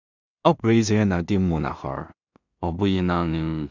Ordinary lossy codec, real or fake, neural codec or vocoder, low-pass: none; fake; codec, 16 kHz in and 24 kHz out, 0.4 kbps, LongCat-Audio-Codec, two codebook decoder; 7.2 kHz